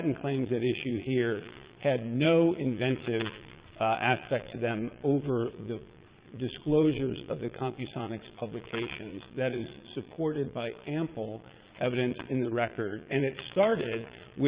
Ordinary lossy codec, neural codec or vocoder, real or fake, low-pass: Opus, 64 kbps; vocoder, 22.05 kHz, 80 mel bands, Vocos; fake; 3.6 kHz